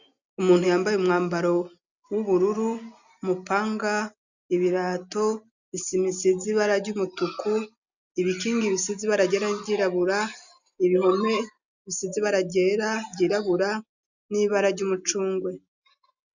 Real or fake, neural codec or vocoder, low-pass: real; none; 7.2 kHz